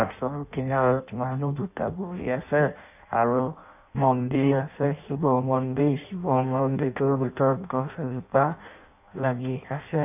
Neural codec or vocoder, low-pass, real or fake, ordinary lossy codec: codec, 16 kHz in and 24 kHz out, 0.6 kbps, FireRedTTS-2 codec; 3.6 kHz; fake; AAC, 32 kbps